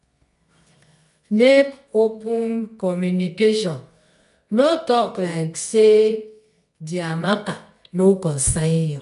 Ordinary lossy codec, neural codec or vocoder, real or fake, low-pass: none; codec, 24 kHz, 0.9 kbps, WavTokenizer, medium music audio release; fake; 10.8 kHz